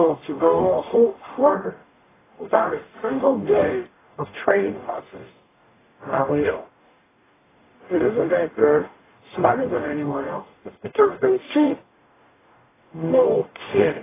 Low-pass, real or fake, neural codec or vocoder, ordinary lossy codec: 3.6 kHz; fake; codec, 44.1 kHz, 0.9 kbps, DAC; AAC, 16 kbps